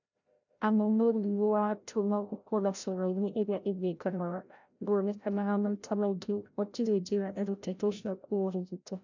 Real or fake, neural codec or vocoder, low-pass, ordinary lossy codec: fake; codec, 16 kHz, 0.5 kbps, FreqCodec, larger model; 7.2 kHz; none